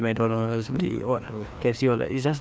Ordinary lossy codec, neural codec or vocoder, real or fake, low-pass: none; codec, 16 kHz, 2 kbps, FreqCodec, larger model; fake; none